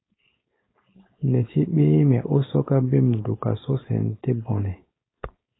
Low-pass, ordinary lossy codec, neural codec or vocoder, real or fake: 7.2 kHz; AAC, 16 kbps; codec, 16 kHz, 4.8 kbps, FACodec; fake